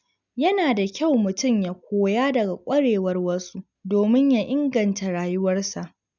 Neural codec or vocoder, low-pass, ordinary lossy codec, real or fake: none; 7.2 kHz; none; real